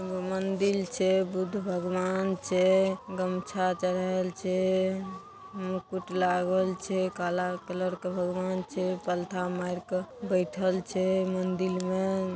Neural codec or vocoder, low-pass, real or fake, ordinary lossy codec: none; none; real; none